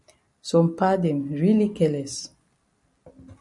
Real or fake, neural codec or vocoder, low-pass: real; none; 10.8 kHz